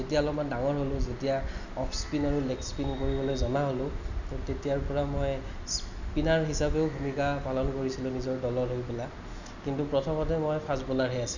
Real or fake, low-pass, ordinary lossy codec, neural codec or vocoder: real; 7.2 kHz; none; none